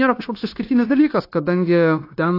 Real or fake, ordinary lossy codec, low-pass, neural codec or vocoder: fake; AAC, 32 kbps; 5.4 kHz; codec, 16 kHz, 0.9 kbps, LongCat-Audio-Codec